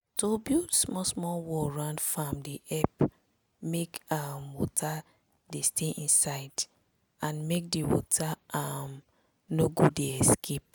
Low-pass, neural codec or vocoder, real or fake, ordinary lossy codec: none; none; real; none